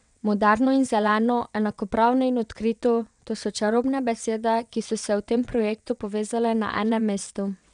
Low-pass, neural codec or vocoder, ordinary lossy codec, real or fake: 9.9 kHz; vocoder, 22.05 kHz, 80 mel bands, WaveNeXt; none; fake